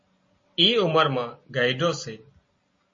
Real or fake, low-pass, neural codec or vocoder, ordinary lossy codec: real; 7.2 kHz; none; MP3, 32 kbps